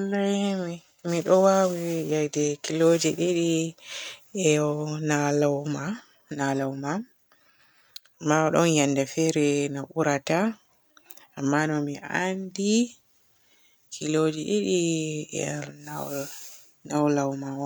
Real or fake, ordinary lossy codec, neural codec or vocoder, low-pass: real; none; none; none